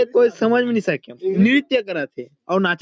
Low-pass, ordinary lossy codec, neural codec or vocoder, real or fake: none; none; none; real